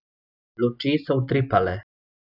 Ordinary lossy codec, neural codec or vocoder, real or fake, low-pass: none; none; real; 5.4 kHz